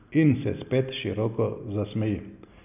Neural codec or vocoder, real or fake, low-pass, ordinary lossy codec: none; real; 3.6 kHz; none